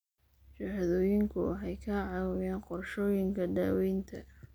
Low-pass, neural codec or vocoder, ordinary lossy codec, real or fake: none; none; none; real